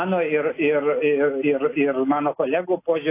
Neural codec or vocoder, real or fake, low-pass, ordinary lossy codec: none; real; 3.6 kHz; AAC, 24 kbps